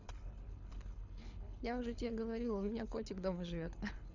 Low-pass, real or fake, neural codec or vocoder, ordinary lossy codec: 7.2 kHz; fake; codec, 24 kHz, 6 kbps, HILCodec; none